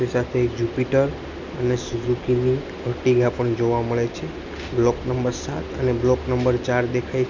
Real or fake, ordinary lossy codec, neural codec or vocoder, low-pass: real; none; none; 7.2 kHz